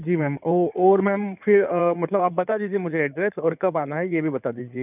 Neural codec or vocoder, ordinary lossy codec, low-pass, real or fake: codec, 16 kHz in and 24 kHz out, 2.2 kbps, FireRedTTS-2 codec; none; 3.6 kHz; fake